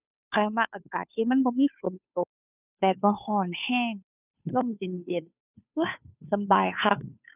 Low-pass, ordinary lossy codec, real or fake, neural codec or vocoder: 3.6 kHz; none; fake; codec, 16 kHz, 2 kbps, FunCodec, trained on Chinese and English, 25 frames a second